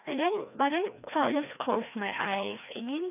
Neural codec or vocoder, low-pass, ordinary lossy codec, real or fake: codec, 16 kHz, 1 kbps, FreqCodec, larger model; 3.6 kHz; none; fake